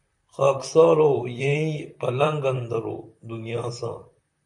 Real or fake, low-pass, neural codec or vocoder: fake; 10.8 kHz; vocoder, 44.1 kHz, 128 mel bands, Pupu-Vocoder